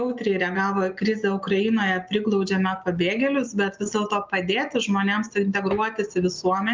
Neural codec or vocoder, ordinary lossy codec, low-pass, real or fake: none; Opus, 24 kbps; 7.2 kHz; real